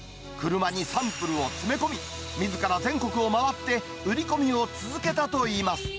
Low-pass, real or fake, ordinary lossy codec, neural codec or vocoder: none; real; none; none